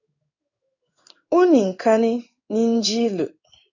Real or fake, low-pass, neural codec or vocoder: fake; 7.2 kHz; codec, 16 kHz in and 24 kHz out, 1 kbps, XY-Tokenizer